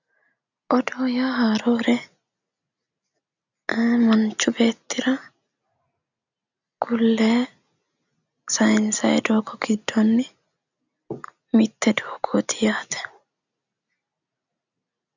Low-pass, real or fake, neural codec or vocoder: 7.2 kHz; real; none